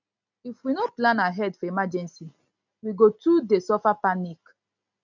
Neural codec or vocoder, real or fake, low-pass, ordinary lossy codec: none; real; 7.2 kHz; none